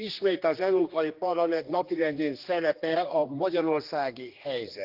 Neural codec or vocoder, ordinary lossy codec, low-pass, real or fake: codec, 16 kHz, 2 kbps, X-Codec, HuBERT features, trained on general audio; Opus, 32 kbps; 5.4 kHz; fake